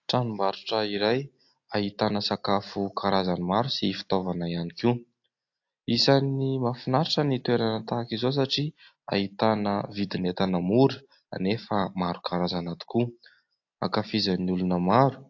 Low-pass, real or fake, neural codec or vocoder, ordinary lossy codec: 7.2 kHz; real; none; AAC, 48 kbps